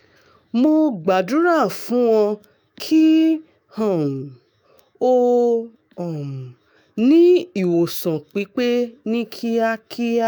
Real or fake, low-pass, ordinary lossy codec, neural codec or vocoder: fake; none; none; autoencoder, 48 kHz, 128 numbers a frame, DAC-VAE, trained on Japanese speech